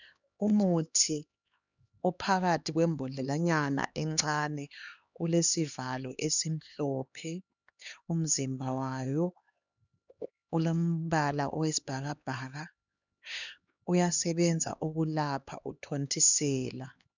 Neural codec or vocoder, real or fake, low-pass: codec, 16 kHz, 2 kbps, X-Codec, HuBERT features, trained on LibriSpeech; fake; 7.2 kHz